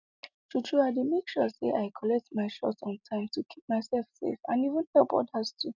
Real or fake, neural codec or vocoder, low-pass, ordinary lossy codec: real; none; 7.2 kHz; none